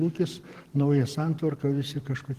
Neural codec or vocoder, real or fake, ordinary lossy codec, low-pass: vocoder, 44.1 kHz, 128 mel bands every 512 samples, BigVGAN v2; fake; Opus, 16 kbps; 14.4 kHz